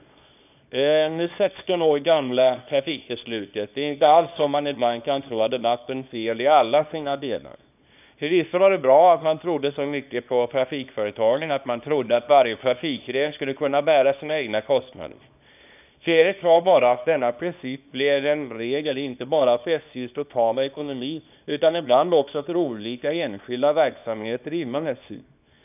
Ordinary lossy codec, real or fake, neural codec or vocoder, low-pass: none; fake; codec, 24 kHz, 0.9 kbps, WavTokenizer, small release; 3.6 kHz